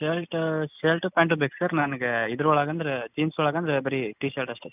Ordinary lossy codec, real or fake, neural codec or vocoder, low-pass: none; real; none; 3.6 kHz